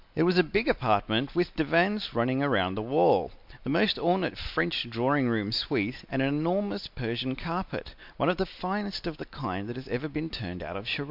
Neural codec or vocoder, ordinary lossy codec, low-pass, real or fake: none; MP3, 48 kbps; 5.4 kHz; real